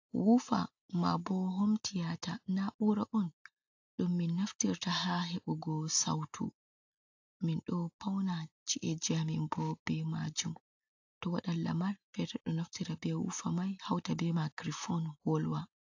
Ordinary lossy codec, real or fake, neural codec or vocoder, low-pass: AAC, 48 kbps; real; none; 7.2 kHz